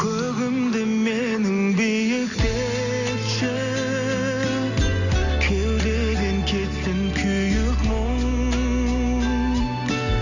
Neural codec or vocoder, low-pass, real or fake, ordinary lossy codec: none; 7.2 kHz; real; none